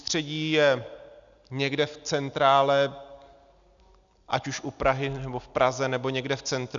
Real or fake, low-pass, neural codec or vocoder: real; 7.2 kHz; none